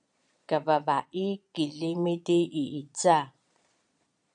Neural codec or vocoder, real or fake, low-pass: vocoder, 22.05 kHz, 80 mel bands, Vocos; fake; 9.9 kHz